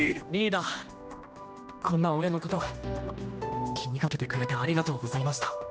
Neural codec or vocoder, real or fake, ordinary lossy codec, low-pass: codec, 16 kHz, 1 kbps, X-Codec, HuBERT features, trained on general audio; fake; none; none